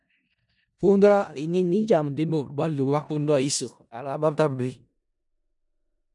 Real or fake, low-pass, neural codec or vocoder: fake; 10.8 kHz; codec, 16 kHz in and 24 kHz out, 0.4 kbps, LongCat-Audio-Codec, four codebook decoder